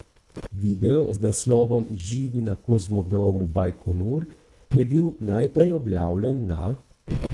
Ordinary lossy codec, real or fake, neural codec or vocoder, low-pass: none; fake; codec, 24 kHz, 1.5 kbps, HILCodec; none